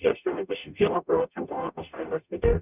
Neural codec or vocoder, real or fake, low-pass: codec, 44.1 kHz, 0.9 kbps, DAC; fake; 3.6 kHz